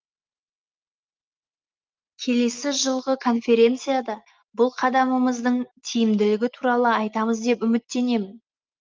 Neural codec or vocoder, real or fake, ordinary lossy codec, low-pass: none; real; Opus, 32 kbps; 7.2 kHz